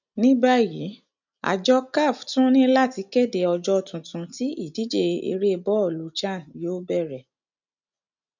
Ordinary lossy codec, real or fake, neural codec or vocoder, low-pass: none; real; none; 7.2 kHz